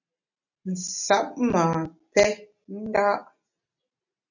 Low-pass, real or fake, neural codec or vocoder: 7.2 kHz; real; none